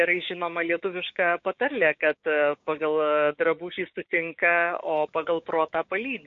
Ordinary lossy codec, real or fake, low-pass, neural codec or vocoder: MP3, 48 kbps; fake; 7.2 kHz; codec, 16 kHz, 6 kbps, DAC